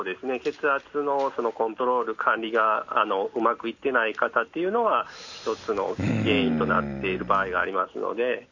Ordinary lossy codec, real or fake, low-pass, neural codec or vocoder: none; real; 7.2 kHz; none